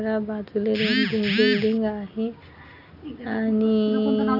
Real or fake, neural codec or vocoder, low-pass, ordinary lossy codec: real; none; 5.4 kHz; none